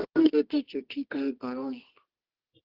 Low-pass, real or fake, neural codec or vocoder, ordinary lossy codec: 5.4 kHz; fake; codec, 24 kHz, 0.9 kbps, WavTokenizer, medium music audio release; Opus, 16 kbps